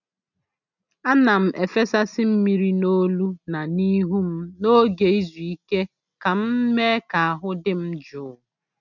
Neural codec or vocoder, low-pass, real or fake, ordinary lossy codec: none; 7.2 kHz; real; none